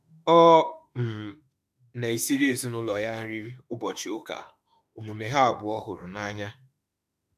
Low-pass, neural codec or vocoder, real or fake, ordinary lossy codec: 14.4 kHz; autoencoder, 48 kHz, 32 numbers a frame, DAC-VAE, trained on Japanese speech; fake; none